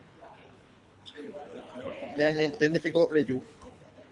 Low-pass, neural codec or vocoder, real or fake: 10.8 kHz; codec, 24 kHz, 3 kbps, HILCodec; fake